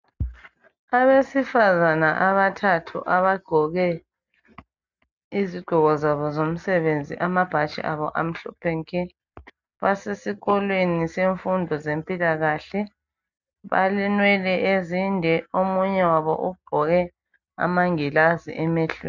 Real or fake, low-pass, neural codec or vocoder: real; 7.2 kHz; none